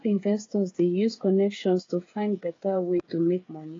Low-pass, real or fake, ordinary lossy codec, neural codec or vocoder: 7.2 kHz; fake; AAC, 32 kbps; codec, 16 kHz, 8 kbps, FreqCodec, smaller model